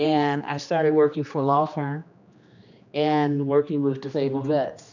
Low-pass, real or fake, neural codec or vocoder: 7.2 kHz; fake; codec, 16 kHz, 2 kbps, X-Codec, HuBERT features, trained on general audio